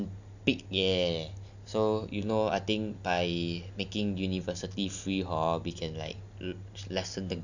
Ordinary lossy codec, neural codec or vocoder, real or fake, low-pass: none; none; real; 7.2 kHz